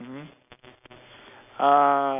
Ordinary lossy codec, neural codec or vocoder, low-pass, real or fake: none; none; 3.6 kHz; real